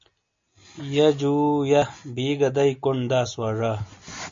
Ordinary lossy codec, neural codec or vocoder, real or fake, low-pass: MP3, 48 kbps; none; real; 7.2 kHz